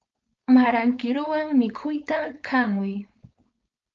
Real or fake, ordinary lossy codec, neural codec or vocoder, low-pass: fake; Opus, 24 kbps; codec, 16 kHz, 4.8 kbps, FACodec; 7.2 kHz